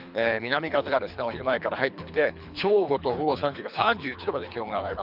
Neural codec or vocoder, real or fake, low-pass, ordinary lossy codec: codec, 24 kHz, 3 kbps, HILCodec; fake; 5.4 kHz; none